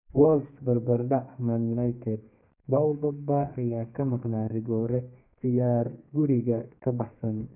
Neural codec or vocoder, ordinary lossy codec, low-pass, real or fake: codec, 32 kHz, 1.9 kbps, SNAC; none; 3.6 kHz; fake